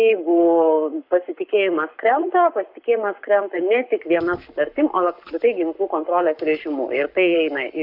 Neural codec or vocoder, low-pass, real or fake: codec, 44.1 kHz, 7.8 kbps, Pupu-Codec; 5.4 kHz; fake